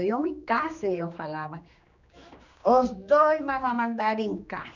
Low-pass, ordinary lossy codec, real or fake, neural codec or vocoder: 7.2 kHz; none; fake; codec, 16 kHz, 2 kbps, X-Codec, HuBERT features, trained on general audio